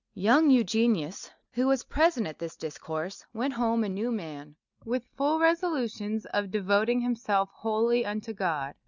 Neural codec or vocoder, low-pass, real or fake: none; 7.2 kHz; real